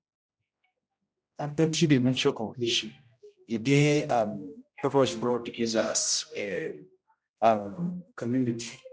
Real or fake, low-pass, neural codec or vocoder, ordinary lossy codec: fake; none; codec, 16 kHz, 0.5 kbps, X-Codec, HuBERT features, trained on general audio; none